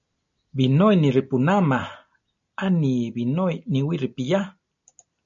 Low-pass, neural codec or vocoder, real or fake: 7.2 kHz; none; real